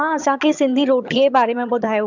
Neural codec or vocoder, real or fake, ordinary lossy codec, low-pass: vocoder, 22.05 kHz, 80 mel bands, HiFi-GAN; fake; MP3, 64 kbps; 7.2 kHz